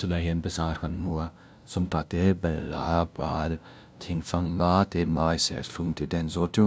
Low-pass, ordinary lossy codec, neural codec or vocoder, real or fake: none; none; codec, 16 kHz, 0.5 kbps, FunCodec, trained on LibriTTS, 25 frames a second; fake